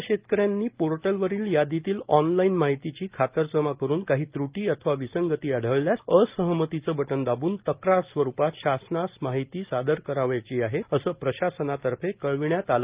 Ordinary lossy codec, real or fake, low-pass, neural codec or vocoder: Opus, 32 kbps; real; 3.6 kHz; none